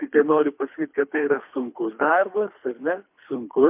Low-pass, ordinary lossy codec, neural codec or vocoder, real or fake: 3.6 kHz; MP3, 32 kbps; codec, 24 kHz, 3 kbps, HILCodec; fake